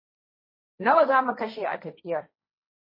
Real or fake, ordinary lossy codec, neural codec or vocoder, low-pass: fake; MP3, 24 kbps; codec, 16 kHz, 1.1 kbps, Voila-Tokenizer; 5.4 kHz